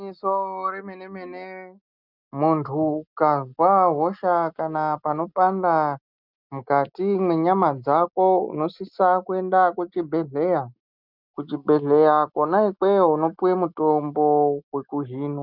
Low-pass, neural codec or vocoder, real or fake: 5.4 kHz; none; real